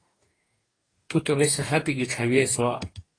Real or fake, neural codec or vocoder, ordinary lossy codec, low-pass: fake; codec, 44.1 kHz, 2.6 kbps, DAC; AAC, 32 kbps; 9.9 kHz